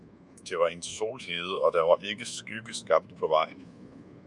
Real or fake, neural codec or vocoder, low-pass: fake; codec, 24 kHz, 1.2 kbps, DualCodec; 10.8 kHz